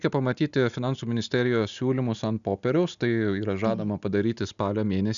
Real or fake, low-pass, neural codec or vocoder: real; 7.2 kHz; none